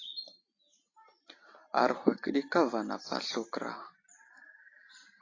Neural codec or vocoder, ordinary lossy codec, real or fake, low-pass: none; AAC, 32 kbps; real; 7.2 kHz